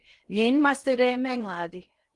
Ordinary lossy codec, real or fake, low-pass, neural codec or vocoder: Opus, 24 kbps; fake; 10.8 kHz; codec, 16 kHz in and 24 kHz out, 0.6 kbps, FocalCodec, streaming, 4096 codes